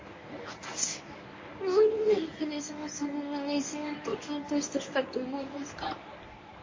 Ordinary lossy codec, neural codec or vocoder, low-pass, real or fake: MP3, 32 kbps; codec, 24 kHz, 0.9 kbps, WavTokenizer, medium speech release version 1; 7.2 kHz; fake